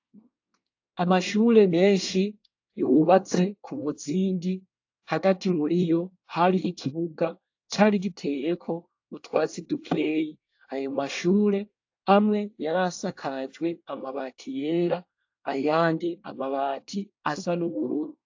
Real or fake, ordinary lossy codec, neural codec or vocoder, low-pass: fake; AAC, 48 kbps; codec, 24 kHz, 1 kbps, SNAC; 7.2 kHz